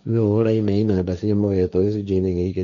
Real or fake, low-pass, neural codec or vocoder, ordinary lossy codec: fake; 7.2 kHz; codec, 16 kHz, 1.1 kbps, Voila-Tokenizer; none